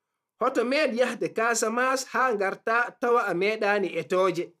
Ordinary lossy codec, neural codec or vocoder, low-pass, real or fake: none; vocoder, 44.1 kHz, 128 mel bands every 512 samples, BigVGAN v2; 14.4 kHz; fake